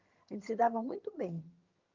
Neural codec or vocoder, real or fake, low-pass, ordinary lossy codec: vocoder, 22.05 kHz, 80 mel bands, HiFi-GAN; fake; 7.2 kHz; Opus, 32 kbps